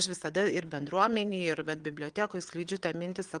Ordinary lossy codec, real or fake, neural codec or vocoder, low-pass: Opus, 24 kbps; fake; vocoder, 22.05 kHz, 80 mel bands, WaveNeXt; 9.9 kHz